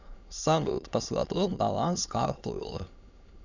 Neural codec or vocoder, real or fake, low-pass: autoencoder, 22.05 kHz, a latent of 192 numbers a frame, VITS, trained on many speakers; fake; 7.2 kHz